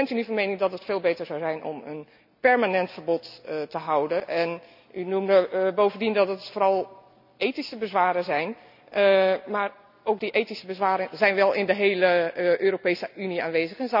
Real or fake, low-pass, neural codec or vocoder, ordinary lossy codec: real; 5.4 kHz; none; none